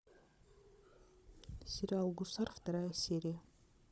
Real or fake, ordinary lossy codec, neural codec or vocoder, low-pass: fake; none; codec, 16 kHz, 16 kbps, FunCodec, trained on Chinese and English, 50 frames a second; none